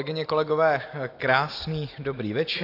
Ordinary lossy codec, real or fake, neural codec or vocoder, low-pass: AAC, 32 kbps; real; none; 5.4 kHz